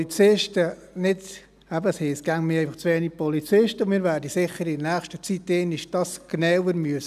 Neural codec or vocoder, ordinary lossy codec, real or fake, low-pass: none; none; real; 14.4 kHz